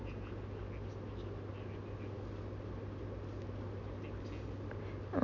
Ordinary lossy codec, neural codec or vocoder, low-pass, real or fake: none; none; 7.2 kHz; real